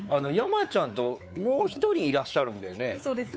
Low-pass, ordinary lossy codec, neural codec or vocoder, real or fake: none; none; codec, 16 kHz, 4 kbps, X-Codec, WavLM features, trained on Multilingual LibriSpeech; fake